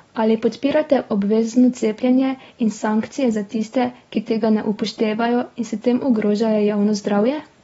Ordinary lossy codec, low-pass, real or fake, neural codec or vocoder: AAC, 24 kbps; 19.8 kHz; real; none